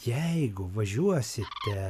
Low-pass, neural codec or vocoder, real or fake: 14.4 kHz; none; real